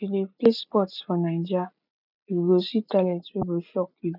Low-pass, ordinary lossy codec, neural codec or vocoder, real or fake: 5.4 kHz; none; none; real